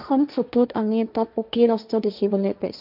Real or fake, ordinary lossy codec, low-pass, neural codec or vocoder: fake; none; 5.4 kHz; codec, 16 kHz, 1.1 kbps, Voila-Tokenizer